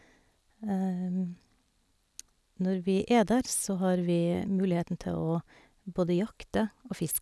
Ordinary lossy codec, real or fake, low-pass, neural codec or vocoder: none; real; none; none